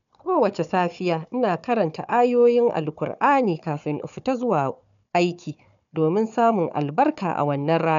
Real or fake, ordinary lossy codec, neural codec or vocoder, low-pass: fake; none; codec, 16 kHz, 4 kbps, FunCodec, trained on Chinese and English, 50 frames a second; 7.2 kHz